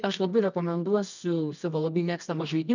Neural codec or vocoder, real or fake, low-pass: codec, 24 kHz, 0.9 kbps, WavTokenizer, medium music audio release; fake; 7.2 kHz